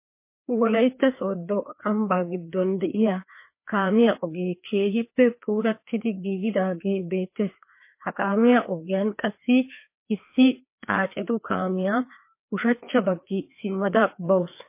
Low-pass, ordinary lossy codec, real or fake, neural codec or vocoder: 3.6 kHz; MP3, 24 kbps; fake; codec, 16 kHz, 2 kbps, FreqCodec, larger model